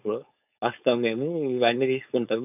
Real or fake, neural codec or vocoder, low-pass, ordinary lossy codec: fake; codec, 16 kHz, 4.8 kbps, FACodec; 3.6 kHz; none